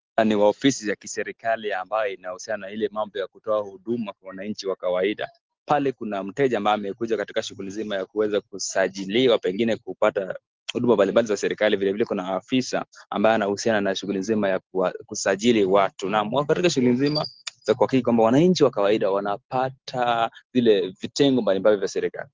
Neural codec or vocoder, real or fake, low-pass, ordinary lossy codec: none; real; 7.2 kHz; Opus, 16 kbps